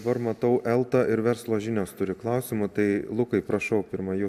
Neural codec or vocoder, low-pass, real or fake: none; 14.4 kHz; real